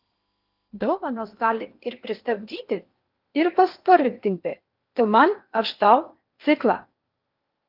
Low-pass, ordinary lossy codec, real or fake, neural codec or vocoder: 5.4 kHz; Opus, 24 kbps; fake; codec, 16 kHz in and 24 kHz out, 0.8 kbps, FocalCodec, streaming, 65536 codes